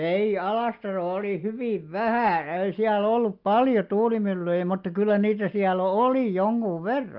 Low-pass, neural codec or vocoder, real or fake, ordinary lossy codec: 5.4 kHz; none; real; Opus, 32 kbps